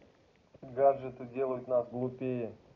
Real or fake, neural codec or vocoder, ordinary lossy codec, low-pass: real; none; none; 7.2 kHz